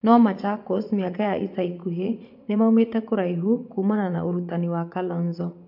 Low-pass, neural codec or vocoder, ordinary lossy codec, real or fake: 5.4 kHz; vocoder, 44.1 kHz, 80 mel bands, Vocos; MP3, 48 kbps; fake